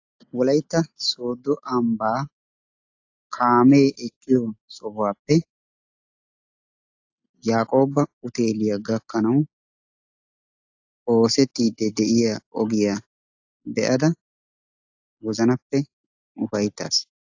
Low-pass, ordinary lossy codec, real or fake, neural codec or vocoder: 7.2 kHz; AAC, 48 kbps; real; none